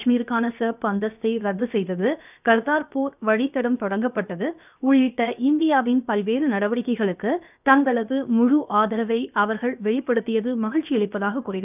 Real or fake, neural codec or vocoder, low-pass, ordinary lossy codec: fake; codec, 16 kHz, 0.7 kbps, FocalCodec; 3.6 kHz; none